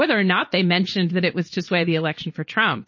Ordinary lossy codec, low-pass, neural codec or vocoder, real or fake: MP3, 32 kbps; 7.2 kHz; vocoder, 22.05 kHz, 80 mel bands, Vocos; fake